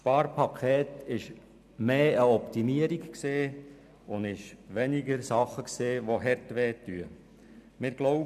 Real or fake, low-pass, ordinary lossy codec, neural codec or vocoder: real; 14.4 kHz; none; none